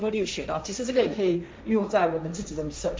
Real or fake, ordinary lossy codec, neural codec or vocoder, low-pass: fake; none; codec, 16 kHz, 1.1 kbps, Voila-Tokenizer; none